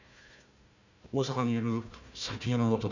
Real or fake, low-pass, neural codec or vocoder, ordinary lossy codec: fake; 7.2 kHz; codec, 16 kHz, 1 kbps, FunCodec, trained on Chinese and English, 50 frames a second; none